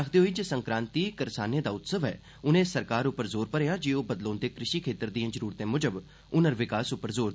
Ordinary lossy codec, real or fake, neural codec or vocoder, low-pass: none; real; none; none